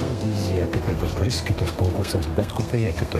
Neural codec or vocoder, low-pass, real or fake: codec, 32 kHz, 1.9 kbps, SNAC; 14.4 kHz; fake